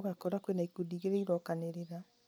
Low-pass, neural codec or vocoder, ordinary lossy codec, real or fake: none; none; none; real